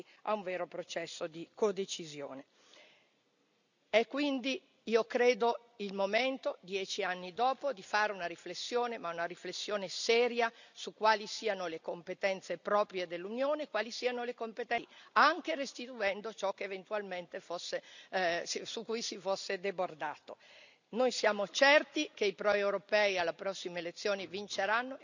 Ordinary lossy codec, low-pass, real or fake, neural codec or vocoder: none; 7.2 kHz; real; none